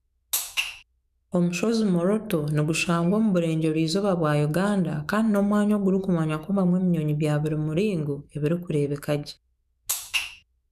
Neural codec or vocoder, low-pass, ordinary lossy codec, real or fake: autoencoder, 48 kHz, 128 numbers a frame, DAC-VAE, trained on Japanese speech; 14.4 kHz; none; fake